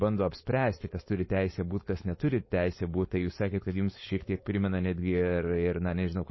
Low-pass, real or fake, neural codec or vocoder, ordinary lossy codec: 7.2 kHz; fake; codec, 16 kHz, 4.8 kbps, FACodec; MP3, 24 kbps